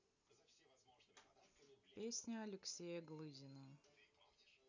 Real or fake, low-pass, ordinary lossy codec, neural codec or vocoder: real; 7.2 kHz; none; none